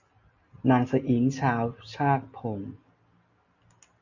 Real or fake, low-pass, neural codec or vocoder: real; 7.2 kHz; none